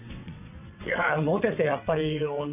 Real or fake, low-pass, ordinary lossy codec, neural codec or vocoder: fake; 3.6 kHz; none; vocoder, 22.05 kHz, 80 mel bands, WaveNeXt